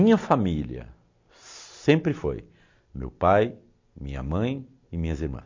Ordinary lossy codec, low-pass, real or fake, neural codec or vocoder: MP3, 48 kbps; 7.2 kHz; real; none